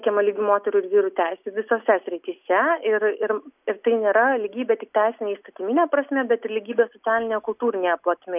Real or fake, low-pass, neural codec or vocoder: real; 3.6 kHz; none